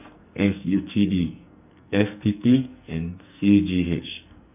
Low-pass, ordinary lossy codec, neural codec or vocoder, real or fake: 3.6 kHz; none; codec, 44.1 kHz, 2.6 kbps, SNAC; fake